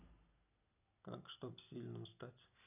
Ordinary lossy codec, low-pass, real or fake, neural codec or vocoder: none; 3.6 kHz; real; none